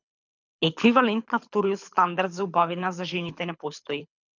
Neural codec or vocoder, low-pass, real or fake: codec, 24 kHz, 6 kbps, HILCodec; 7.2 kHz; fake